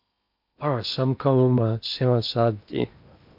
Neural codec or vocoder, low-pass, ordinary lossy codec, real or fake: codec, 16 kHz in and 24 kHz out, 0.8 kbps, FocalCodec, streaming, 65536 codes; 5.4 kHz; MP3, 48 kbps; fake